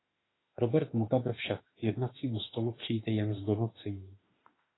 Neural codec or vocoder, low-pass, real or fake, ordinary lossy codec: autoencoder, 48 kHz, 32 numbers a frame, DAC-VAE, trained on Japanese speech; 7.2 kHz; fake; AAC, 16 kbps